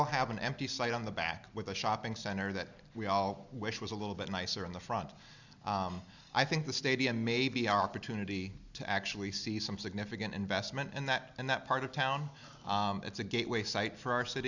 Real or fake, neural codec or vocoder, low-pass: real; none; 7.2 kHz